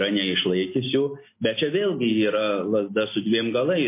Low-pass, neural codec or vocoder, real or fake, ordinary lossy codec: 3.6 kHz; none; real; MP3, 24 kbps